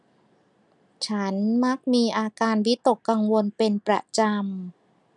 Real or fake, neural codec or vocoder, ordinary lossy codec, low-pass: real; none; none; 10.8 kHz